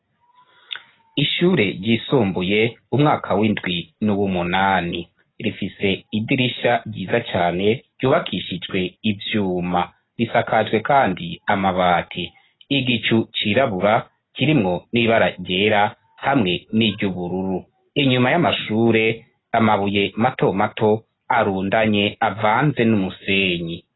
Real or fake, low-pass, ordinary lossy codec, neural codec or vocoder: real; 7.2 kHz; AAC, 16 kbps; none